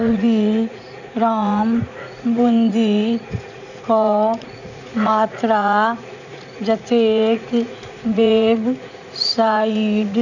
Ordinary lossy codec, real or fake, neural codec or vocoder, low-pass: none; fake; vocoder, 44.1 kHz, 80 mel bands, Vocos; 7.2 kHz